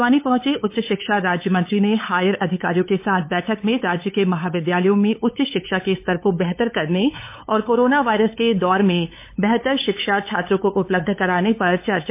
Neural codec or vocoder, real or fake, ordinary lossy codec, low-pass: codec, 16 kHz, 8 kbps, FunCodec, trained on LibriTTS, 25 frames a second; fake; MP3, 24 kbps; 3.6 kHz